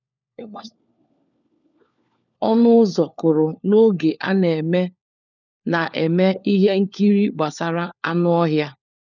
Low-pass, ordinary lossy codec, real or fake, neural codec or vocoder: 7.2 kHz; none; fake; codec, 16 kHz, 4 kbps, FunCodec, trained on LibriTTS, 50 frames a second